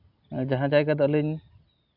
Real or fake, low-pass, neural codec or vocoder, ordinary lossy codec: real; 5.4 kHz; none; AAC, 48 kbps